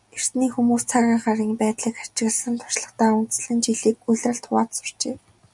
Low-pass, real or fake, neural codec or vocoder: 10.8 kHz; real; none